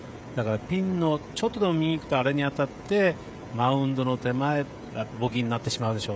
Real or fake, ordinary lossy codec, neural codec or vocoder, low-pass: fake; none; codec, 16 kHz, 16 kbps, FreqCodec, smaller model; none